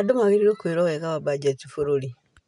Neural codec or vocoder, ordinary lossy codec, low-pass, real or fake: none; none; 10.8 kHz; real